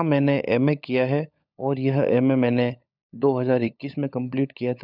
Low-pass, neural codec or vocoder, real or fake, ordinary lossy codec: 5.4 kHz; codec, 16 kHz, 16 kbps, FunCodec, trained on LibriTTS, 50 frames a second; fake; none